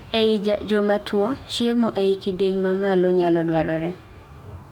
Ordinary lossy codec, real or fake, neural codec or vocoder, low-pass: none; fake; codec, 44.1 kHz, 2.6 kbps, DAC; 19.8 kHz